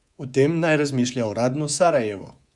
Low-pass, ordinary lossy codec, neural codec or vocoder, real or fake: 10.8 kHz; Opus, 64 kbps; codec, 24 kHz, 3.1 kbps, DualCodec; fake